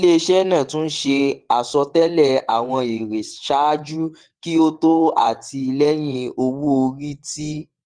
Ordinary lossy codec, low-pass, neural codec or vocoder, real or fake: Opus, 24 kbps; 9.9 kHz; vocoder, 22.05 kHz, 80 mel bands, WaveNeXt; fake